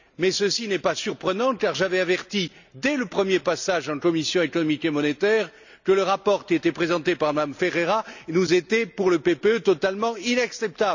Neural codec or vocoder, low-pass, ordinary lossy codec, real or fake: none; 7.2 kHz; none; real